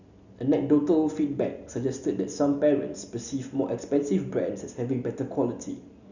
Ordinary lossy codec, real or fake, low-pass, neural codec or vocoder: none; real; 7.2 kHz; none